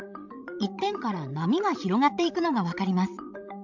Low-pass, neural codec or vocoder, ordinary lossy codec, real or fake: 7.2 kHz; codec, 16 kHz, 16 kbps, FreqCodec, larger model; MP3, 64 kbps; fake